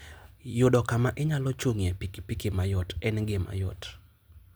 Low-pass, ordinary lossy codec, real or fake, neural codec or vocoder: none; none; real; none